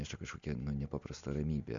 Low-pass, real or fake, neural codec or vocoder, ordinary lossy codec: 7.2 kHz; real; none; MP3, 64 kbps